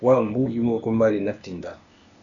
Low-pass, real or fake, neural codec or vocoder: 7.2 kHz; fake; codec, 16 kHz, 0.8 kbps, ZipCodec